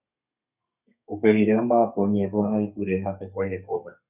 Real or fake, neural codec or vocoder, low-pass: fake; codec, 32 kHz, 1.9 kbps, SNAC; 3.6 kHz